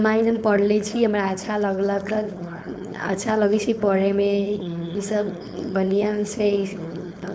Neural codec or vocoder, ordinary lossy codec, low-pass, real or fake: codec, 16 kHz, 4.8 kbps, FACodec; none; none; fake